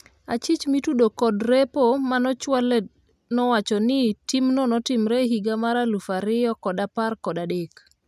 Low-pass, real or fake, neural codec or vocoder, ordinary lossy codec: 14.4 kHz; real; none; none